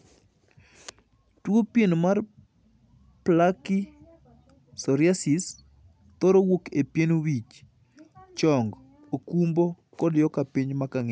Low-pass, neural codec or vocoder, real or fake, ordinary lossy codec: none; none; real; none